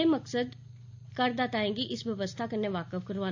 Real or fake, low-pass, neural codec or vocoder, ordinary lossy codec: real; 7.2 kHz; none; AAC, 48 kbps